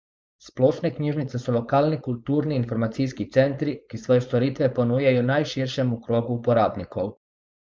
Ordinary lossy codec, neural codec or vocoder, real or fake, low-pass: none; codec, 16 kHz, 4.8 kbps, FACodec; fake; none